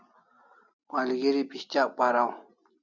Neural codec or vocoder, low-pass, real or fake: none; 7.2 kHz; real